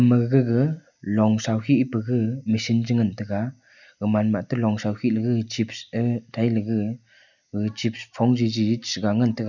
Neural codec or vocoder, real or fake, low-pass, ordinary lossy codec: none; real; 7.2 kHz; none